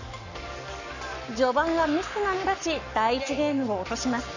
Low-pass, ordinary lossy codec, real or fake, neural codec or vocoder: 7.2 kHz; none; fake; codec, 44.1 kHz, 7.8 kbps, Pupu-Codec